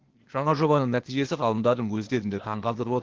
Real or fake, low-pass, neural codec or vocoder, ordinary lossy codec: fake; 7.2 kHz; codec, 16 kHz, 0.8 kbps, ZipCodec; Opus, 16 kbps